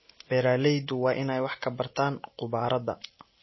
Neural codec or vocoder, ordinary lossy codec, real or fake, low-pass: none; MP3, 24 kbps; real; 7.2 kHz